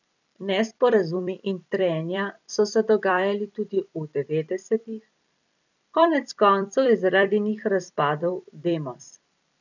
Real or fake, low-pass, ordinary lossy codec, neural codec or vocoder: fake; 7.2 kHz; none; vocoder, 44.1 kHz, 128 mel bands every 256 samples, BigVGAN v2